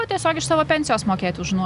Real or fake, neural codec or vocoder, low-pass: real; none; 10.8 kHz